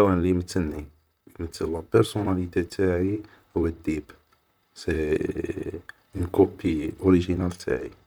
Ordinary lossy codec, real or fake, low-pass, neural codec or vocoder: none; fake; none; vocoder, 44.1 kHz, 128 mel bands, Pupu-Vocoder